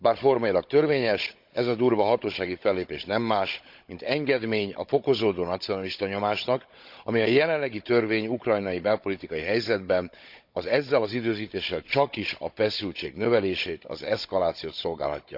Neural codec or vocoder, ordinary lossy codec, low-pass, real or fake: codec, 16 kHz, 16 kbps, FunCodec, trained on Chinese and English, 50 frames a second; MP3, 48 kbps; 5.4 kHz; fake